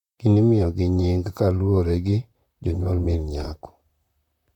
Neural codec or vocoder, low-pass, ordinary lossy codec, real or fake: vocoder, 44.1 kHz, 128 mel bands, Pupu-Vocoder; 19.8 kHz; none; fake